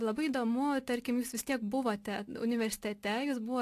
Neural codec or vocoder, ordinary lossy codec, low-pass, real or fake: none; AAC, 64 kbps; 14.4 kHz; real